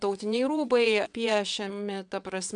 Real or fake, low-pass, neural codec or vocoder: fake; 9.9 kHz; vocoder, 22.05 kHz, 80 mel bands, WaveNeXt